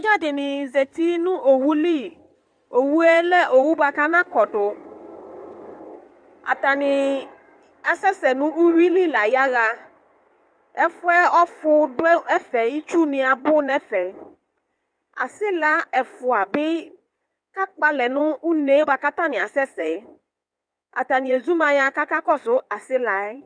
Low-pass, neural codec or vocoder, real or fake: 9.9 kHz; codec, 16 kHz in and 24 kHz out, 2.2 kbps, FireRedTTS-2 codec; fake